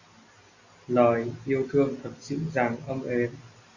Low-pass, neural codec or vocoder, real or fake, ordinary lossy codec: 7.2 kHz; none; real; Opus, 64 kbps